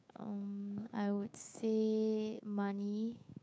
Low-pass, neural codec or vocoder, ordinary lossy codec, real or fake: none; codec, 16 kHz, 6 kbps, DAC; none; fake